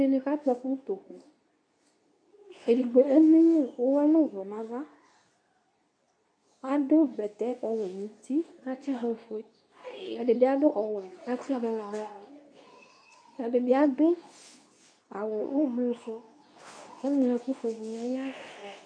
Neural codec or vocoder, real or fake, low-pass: codec, 24 kHz, 0.9 kbps, WavTokenizer, medium speech release version 2; fake; 9.9 kHz